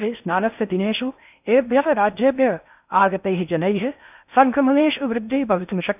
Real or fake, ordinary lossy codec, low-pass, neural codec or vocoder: fake; none; 3.6 kHz; codec, 16 kHz in and 24 kHz out, 0.6 kbps, FocalCodec, streaming, 4096 codes